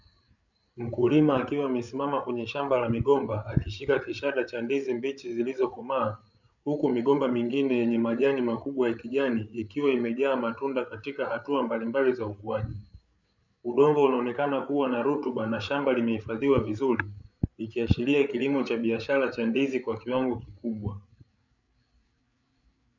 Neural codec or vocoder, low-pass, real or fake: codec, 16 kHz, 8 kbps, FreqCodec, larger model; 7.2 kHz; fake